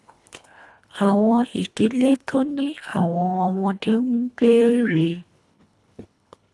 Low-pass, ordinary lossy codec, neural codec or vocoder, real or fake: none; none; codec, 24 kHz, 1.5 kbps, HILCodec; fake